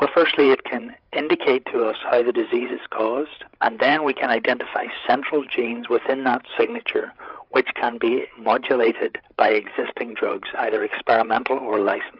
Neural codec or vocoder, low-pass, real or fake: codec, 16 kHz, 8 kbps, FreqCodec, larger model; 5.4 kHz; fake